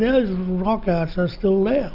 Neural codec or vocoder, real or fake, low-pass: none; real; 5.4 kHz